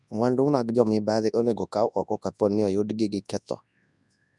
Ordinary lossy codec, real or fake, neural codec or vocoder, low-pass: none; fake; codec, 24 kHz, 0.9 kbps, WavTokenizer, large speech release; 10.8 kHz